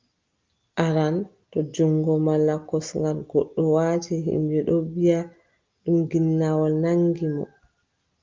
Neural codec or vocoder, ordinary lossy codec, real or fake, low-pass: none; Opus, 32 kbps; real; 7.2 kHz